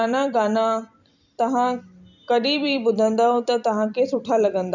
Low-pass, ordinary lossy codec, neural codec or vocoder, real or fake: 7.2 kHz; none; vocoder, 44.1 kHz, 128 mel bands every 256 samples, BigVGAN v2; fake